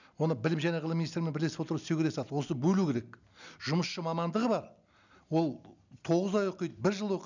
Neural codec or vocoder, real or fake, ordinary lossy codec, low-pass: none; real; none; 7.2 kHz